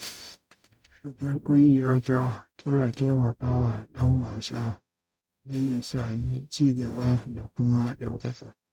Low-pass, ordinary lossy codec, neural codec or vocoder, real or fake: 19.8 kHz; none; codec, 44.1 kHz, 0.9 kbps, DAC; fake